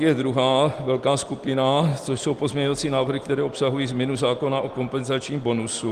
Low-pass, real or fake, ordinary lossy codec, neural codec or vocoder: 14.4 kHz; real; Opus, 24 kbps; none